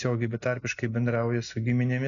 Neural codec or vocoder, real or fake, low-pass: none; real; 7.2 kHz